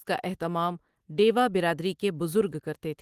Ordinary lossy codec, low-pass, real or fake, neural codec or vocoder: Opus, 24 kbps; 14.4 kHz; real; none